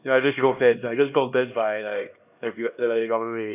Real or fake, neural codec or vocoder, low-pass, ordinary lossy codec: fake; codec, 16 kHz, 1 kbps, X-Codec, HuBERT features, trained on LibriSpeech; 3.6 kHz; none